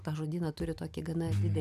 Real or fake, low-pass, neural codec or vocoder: real; 14.4 kHz; none